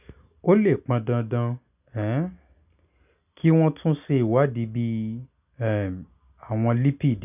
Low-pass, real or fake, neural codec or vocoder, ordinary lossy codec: 3.6 kHz; real; none; none